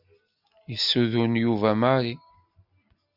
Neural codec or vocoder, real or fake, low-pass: none; real; 5.4 kHz